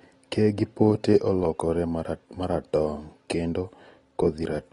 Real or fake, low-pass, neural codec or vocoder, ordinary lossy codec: real; 10.8 kHz; none; AAC, 32 kbps